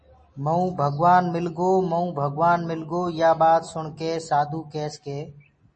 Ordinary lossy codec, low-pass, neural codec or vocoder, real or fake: MP3, 32 kbps; 10.8 kHz; none; real